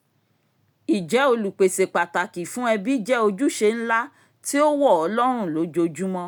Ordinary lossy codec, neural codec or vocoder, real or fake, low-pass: none; none; real; none